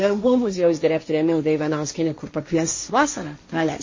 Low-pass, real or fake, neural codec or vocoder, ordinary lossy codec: 7.2 kHz; fake; codec, 16 kHz, 1.1 kbps, Voila-Tokenizer; MP3, 32 kbps